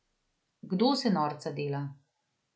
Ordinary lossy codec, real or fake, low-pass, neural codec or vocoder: none; real; none; none